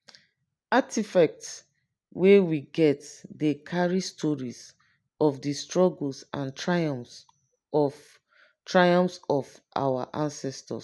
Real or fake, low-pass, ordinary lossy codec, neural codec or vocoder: real; none; none; none